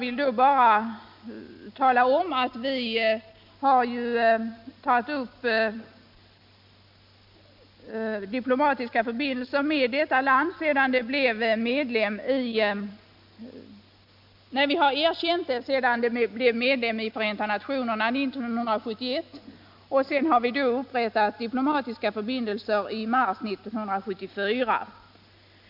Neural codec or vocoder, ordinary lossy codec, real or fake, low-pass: none; MP3, 48 kbps; real; 5.4 kHz